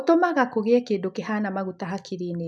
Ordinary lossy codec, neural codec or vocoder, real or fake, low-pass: none; none; real; none